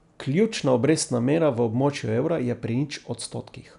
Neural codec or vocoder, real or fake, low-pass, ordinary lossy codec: none; real; 10.8 kHz; none